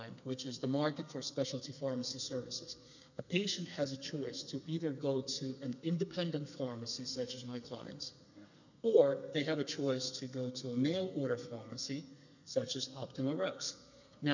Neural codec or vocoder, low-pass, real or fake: codec, 32 kHz, 1.9 kbps, SNAC; 7.2 kHz; fake